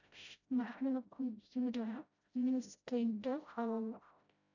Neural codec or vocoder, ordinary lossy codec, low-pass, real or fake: codec, 16 kHz, 0.5 kbps, FreqCodec, smaller model; none; 7.2 kHz; fake